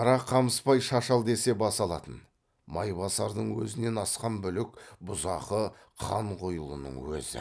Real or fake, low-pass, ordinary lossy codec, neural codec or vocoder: real; none; none; none